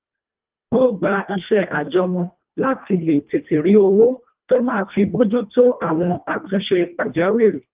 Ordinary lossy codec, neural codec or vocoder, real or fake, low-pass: Opus, 32 kbps; codec, 24 kHz, 1.5 kbps, HILCodec; fake; 3.6 kHz